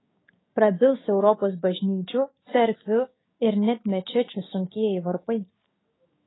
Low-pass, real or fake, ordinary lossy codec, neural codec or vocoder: 7.2 kHz; fake; AAC, 16 kbps; codec, 16 kHz, 6 kbps, DAC